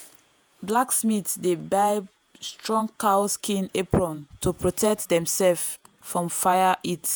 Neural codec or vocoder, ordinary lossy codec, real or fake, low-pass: vocoder, 48 kHz, 128 mel bands, Vocos; none; fake; none